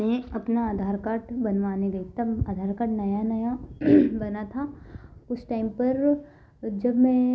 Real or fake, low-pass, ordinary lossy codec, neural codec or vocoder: real; none; none; none